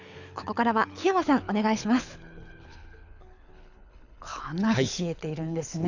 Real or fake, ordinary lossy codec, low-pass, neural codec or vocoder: fake; none; 7.2 kHz; codec, 24 kHz, 6 kbps, HILCodec